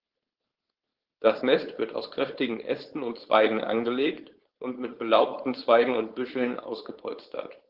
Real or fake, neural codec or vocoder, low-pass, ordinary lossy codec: fake; codec, 16 kHz, 4.8 kbps, FACodec; 5.4 kHz; Opus, 16 kbps